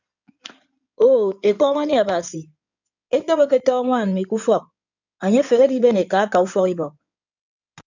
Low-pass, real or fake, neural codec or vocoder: 7.2 kHz; fake; codec, 16 kHz in and 24 kHz out, 2.2 kbps, FireRedTTS-2 codec